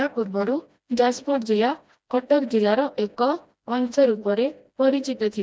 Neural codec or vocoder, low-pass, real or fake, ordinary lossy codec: codec, 16 kHz, 1 kbps, FreqCodec, smaller model; none; fake; none